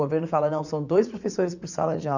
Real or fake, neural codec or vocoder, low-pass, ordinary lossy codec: fake; vocoder, 44.1 kHz, 80 mel bands, Vocos; 7.2 kHz; none